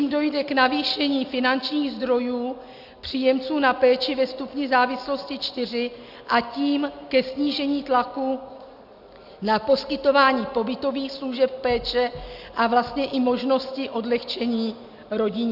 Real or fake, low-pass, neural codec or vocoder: real; 5.4 kHz; none